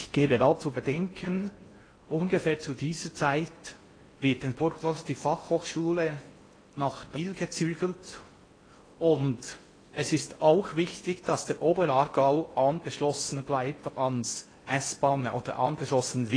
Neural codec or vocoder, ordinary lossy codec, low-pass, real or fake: codec, 16 kHz in and 24 kHz out, 0.6 kbps, FocalCodec, streaming, 4096 codes; AAC, 32 kbps; 9.9 kHz; fake